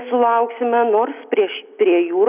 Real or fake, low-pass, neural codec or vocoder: real; 3.6 kHz; none